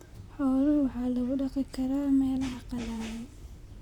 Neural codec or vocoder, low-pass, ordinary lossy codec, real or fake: vocoder, 44.1 kHz, 128 mel bands, Pupu-Vocoder; 19.8 kHz; none; fake